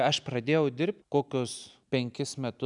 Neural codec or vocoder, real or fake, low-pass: none; real; 10.8 kHz